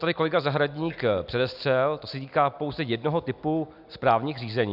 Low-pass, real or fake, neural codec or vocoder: 5.4 kHz; real; none